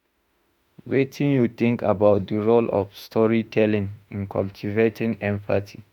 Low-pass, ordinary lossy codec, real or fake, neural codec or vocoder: 19.8 kHz; none; fake; autoencoder, 48 kHz, 32 numbers a frame, DAC-VAE, trained on Japanese speech